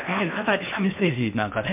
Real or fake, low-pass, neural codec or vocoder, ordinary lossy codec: fake; 3.6 kHz; codec, 16 kHz in and 24 kHz out, 0.8 kbps, FocalCodec, streaming, 65536 codes; MP3, 32 kbps